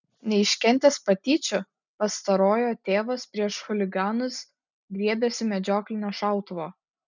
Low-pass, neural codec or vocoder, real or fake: 7.2 kHz; none; real